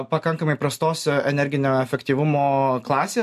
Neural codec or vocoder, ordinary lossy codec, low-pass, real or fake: none; MP3, 64 kbps; 14.4 kHz; real